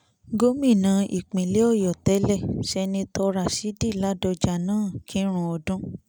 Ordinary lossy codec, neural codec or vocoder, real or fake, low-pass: none; none; real; none